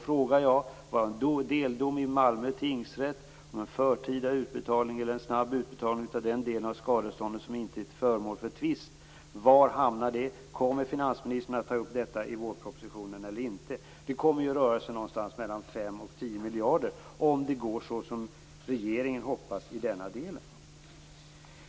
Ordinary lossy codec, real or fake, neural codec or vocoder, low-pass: none; real; none; none